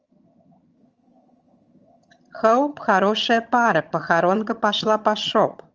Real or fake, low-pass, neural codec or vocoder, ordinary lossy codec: fake; 7.2 kHz; vocoder, 22.05 kHz, 80 mel bands, HiFi-GAN; Opus, 24 kbps